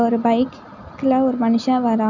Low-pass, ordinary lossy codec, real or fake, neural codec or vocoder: 7.2 kHz; none; real; none